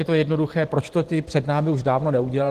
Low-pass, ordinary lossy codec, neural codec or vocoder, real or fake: 14.4 kHz; Opus, 16 kbps; vocoder, 44.1 kHz, 128 mel bands every 512 samples, BigVGAN v2; fake